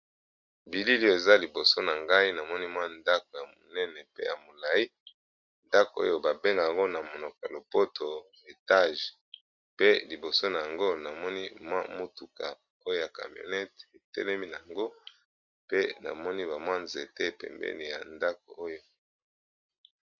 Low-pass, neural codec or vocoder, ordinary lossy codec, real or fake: 7.2 kHz; none; MP3, 64 kbps; real